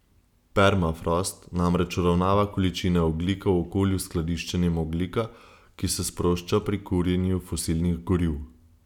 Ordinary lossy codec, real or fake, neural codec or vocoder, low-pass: none; real; none; 19.8 kHz